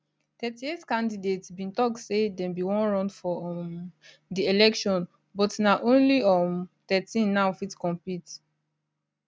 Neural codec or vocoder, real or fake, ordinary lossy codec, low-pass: none; real; none; none